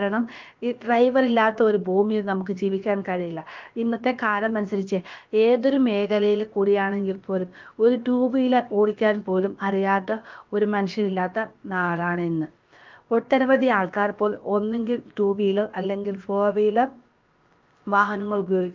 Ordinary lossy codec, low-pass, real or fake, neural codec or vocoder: Opus, 32 kbps; 7.2 kHz; fake; codec, 16 kHz, about 1 kbps, DyCAST, with the encoder's durations